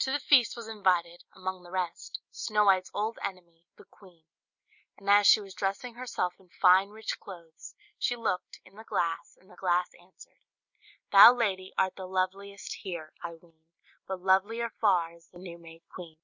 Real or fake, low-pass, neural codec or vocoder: real; 7.2 kHz; none